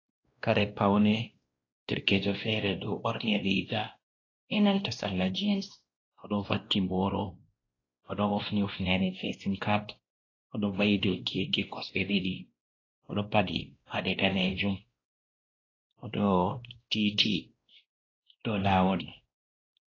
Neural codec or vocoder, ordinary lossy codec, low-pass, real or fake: codec, 16 kHz, 1 kbps, X-Codec, WavLM features, trained on Multilingual LibriSpeech; AAC, 32 kbps; 7.2 kHz; fake